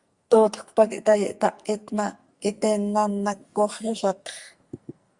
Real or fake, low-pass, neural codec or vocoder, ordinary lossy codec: fake; 10.8 kHz; codec, 44.1 kHz, 2.6 kbps, SNAC; Opus, 64 kbps